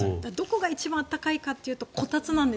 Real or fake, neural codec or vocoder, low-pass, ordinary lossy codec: real; none; none; none